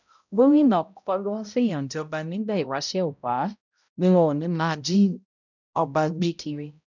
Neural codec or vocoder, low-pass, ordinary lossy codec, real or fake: codec, 16 kHz, 0.5 kbps, X-Codec, HuBERT features, trained on balanced general audio; 7.2 kHz; none; fake